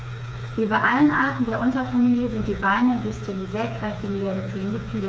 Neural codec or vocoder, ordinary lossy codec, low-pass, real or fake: codec, 16 kHz, 4 kbps, FreqCodec, smaller model; none; none; fake